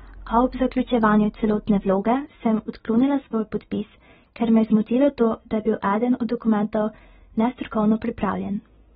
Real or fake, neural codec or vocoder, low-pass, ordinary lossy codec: real; none; 19.8 kHz; AAC, 16 kbps